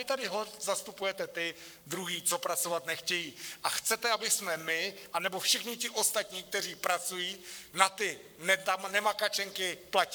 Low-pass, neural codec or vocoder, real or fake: 19.8 kHz; codec, 44.1 kHz, 7.8 kbps, Pupu-Codec; fake